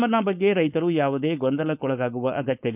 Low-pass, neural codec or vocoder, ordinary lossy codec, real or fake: 3.6 kHz; codec, 16 kHz, 4.8 kbps, FACodec; none; fake